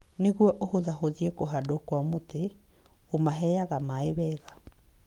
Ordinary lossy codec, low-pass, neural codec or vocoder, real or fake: Opus, 32 kbps; 19.8 kHz; codec, 44.1 kHz, 7.8 kbps, Pupu-Codec; fake